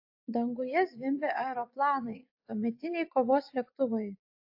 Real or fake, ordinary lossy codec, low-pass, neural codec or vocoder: fake; AAC, 48 kbps; 5.4 kHz; vocoder, 24 kHz, 100 mel bands, Vocos